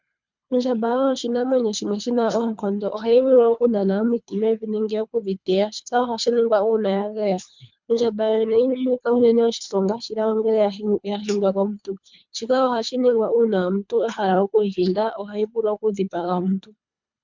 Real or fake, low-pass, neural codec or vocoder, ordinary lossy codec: fake; 7.2 kHz; codec, 24 kHz, 3 kbps, HILCodec; MP3, 64 kbps